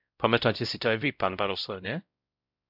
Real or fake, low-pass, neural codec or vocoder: fake; 5.4 kHz; codec, 16 kHz, 0.5 kbps, X-Codec, WavLM features, trained on Multilingual LibriSpeech